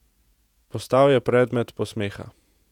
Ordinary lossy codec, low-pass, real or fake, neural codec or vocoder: none; 19.8 kHz; fake; vocoder, 48 kHz, 128 mel bands, Vocos